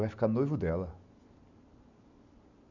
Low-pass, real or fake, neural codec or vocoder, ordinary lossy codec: 7.2 kHz; real; none; none